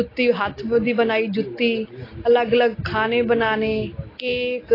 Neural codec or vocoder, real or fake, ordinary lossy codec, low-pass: none; real; AAC, 24 kbps; 5.4 kHz